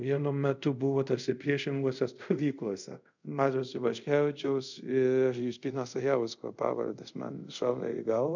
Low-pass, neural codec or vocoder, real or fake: 7.2 kHz; codec, 24 kHz, 0.5 kbps, DualCodec; fake